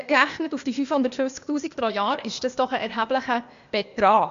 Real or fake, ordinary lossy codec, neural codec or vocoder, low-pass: fake; MP3, 64 kbps; codec, 16 kHz, 0.8 kbps, ZipCodec; 7.2 kHz